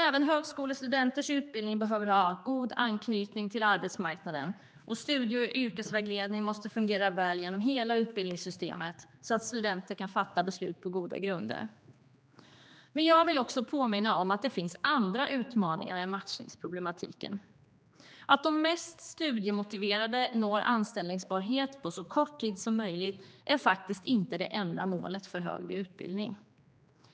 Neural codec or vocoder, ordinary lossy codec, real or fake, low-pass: codec, 16 kHz, 2 kbps, X-Codec, HuBERT features, trained on general audio; none; fake; none